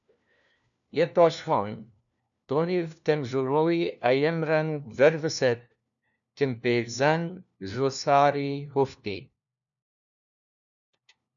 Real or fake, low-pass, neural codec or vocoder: fake; 7.2 kHz; codec, 16 kHz, 1 kbps, FunCodec, trained on LibriTTS, 50 frames a second